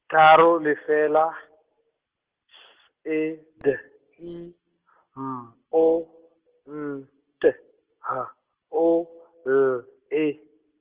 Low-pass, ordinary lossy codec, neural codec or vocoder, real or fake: 3.6 kHz; Opus, 16 kbps; none; real